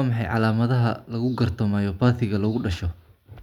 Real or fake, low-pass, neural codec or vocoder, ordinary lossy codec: real; 19.8 kHz; none; none